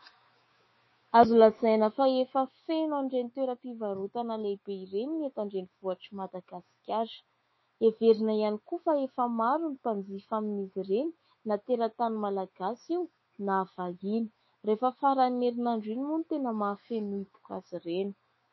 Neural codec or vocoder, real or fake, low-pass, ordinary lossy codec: autoencoder, 48 kHz, 128 numbers a frame, DAC-VAE, trained on Japanese speech; fake; 7.2 kHz; MP3, 24 kbps